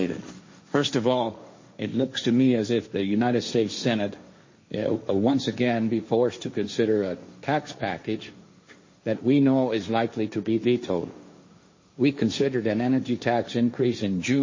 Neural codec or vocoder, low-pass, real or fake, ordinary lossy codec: codec, 16 kHz, 1.1 kbps, Voila-Tokenizer; 7.2 kHz; fake; MP3, 32 kbps